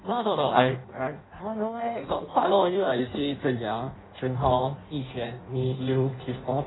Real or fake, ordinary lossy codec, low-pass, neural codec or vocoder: fake; AAC, 16 kbps; 7.2 kHz; codec, 16 kHz in and 24 kHz out, 0.6 kbps, FireRedTTS-2 codec